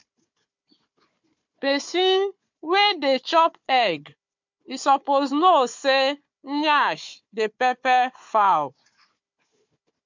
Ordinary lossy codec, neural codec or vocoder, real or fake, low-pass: MP3, 64 kbps; codec, 16 kHz, 4 kbps, FunCodec, trained on Chinese and English, 50 frames a second; fake; 7.2 kHz